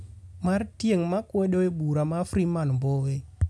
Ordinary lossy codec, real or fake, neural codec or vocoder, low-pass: none; real; none; none